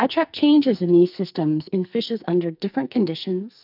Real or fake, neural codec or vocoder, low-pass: fake; codec, 44.1 kHz, 2.6 kbps, SNAC; 5.4 kHz